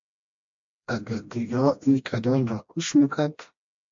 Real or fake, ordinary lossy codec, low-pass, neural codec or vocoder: fake; MP3, 48 kbps; 7.2 kHz; codec, 16 kHz, 1 kbps, FreqCodec, smaller model